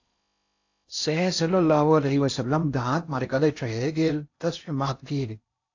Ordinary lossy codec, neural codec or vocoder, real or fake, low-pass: AAC, 48 kbps; codec, 16 kHz in and 24 kHz out, 0.6 kbps, FocalCodec, streaming, 4096 codes; fake; 7.2 kHz